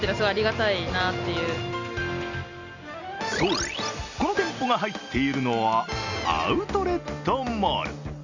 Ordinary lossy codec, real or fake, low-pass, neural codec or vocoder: Opus, 64 kbps; real; 7.2 kHz; none